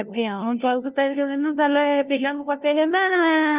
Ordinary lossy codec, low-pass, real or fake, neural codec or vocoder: Opus, 24 kbps; 3.6 kHz; fake; codec, 16 kHz, 0.5 kbps, FunCodec, trained on LibriTTS, 25 frames a second